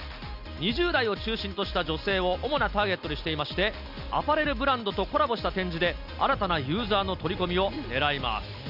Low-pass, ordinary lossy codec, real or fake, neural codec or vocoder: 5.4 kHz; none; real; none